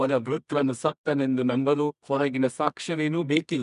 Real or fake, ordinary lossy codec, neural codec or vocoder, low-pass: fake; none; codec, 24 kHz, 0.9 kbps, WavTokenizer, medium music audio release; 10.8 kHz